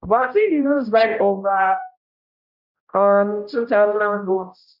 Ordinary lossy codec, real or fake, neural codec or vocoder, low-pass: none; fake; codec, 16 kHz, 0.5 kbps, X-Codec, HuBERT features, trained on general audio; 5.4 kHz